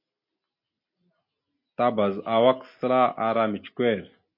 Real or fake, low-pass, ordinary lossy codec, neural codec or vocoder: real; 5.4 kHz; MP3, 32 kbps; none